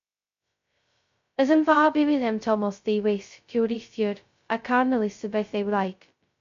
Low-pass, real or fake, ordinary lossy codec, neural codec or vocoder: 7.2 kHz; fake; MP3, 48 kbps; codec, 16 kHz, 0.2 kbps, FocalCodec